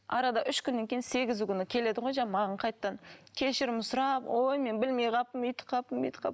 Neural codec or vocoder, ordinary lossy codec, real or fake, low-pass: none; none; real; none